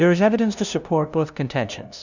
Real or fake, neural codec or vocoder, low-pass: fake; codec, 16 kHz, 0.5 kbps, FunCodec, trained on LibriTTS, 25 frames a second; 7.2 kHz